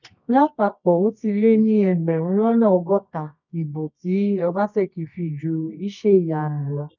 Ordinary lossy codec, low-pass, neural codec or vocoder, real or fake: none; 7.2 kHz; codec, 24 kHz, 0.9 kbps, WavTokenizer, medium music audio release; fake